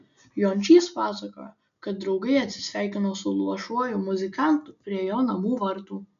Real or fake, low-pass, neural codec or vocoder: real; 7.2 kHz; none